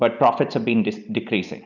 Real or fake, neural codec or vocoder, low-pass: real; none; 7.2 kHz